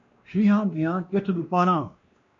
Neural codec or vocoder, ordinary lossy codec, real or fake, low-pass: codec, 16 kHz, 1 kbps, X-Codec, WavLM features, trained on Multilingual LibriSpeech; MP3, 48 kbps; fake; 7.2 kHz